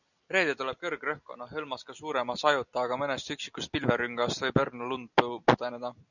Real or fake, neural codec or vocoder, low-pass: real; none; 7.2 kHz